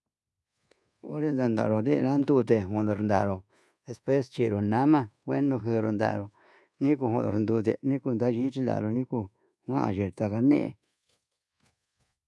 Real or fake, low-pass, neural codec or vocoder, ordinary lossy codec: fake; none; codec, 24 kHz, 1.2 kbps, DualCodec; none